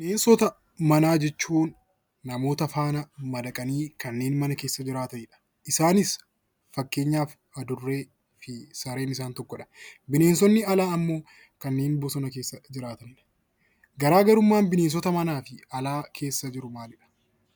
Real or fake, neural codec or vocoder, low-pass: real; none; 19.8 kHz